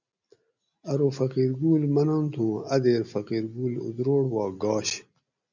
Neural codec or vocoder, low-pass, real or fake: none; 7.2 kHz; real